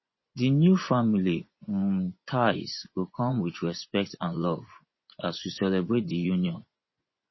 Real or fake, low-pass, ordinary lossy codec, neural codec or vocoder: fake; 7.2 kHz; MP3, 24 kbps; vocoder, 24 kHz, 100 mel bands, Vocos